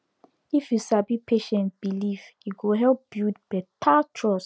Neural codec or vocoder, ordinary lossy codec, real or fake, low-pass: none; none; real; none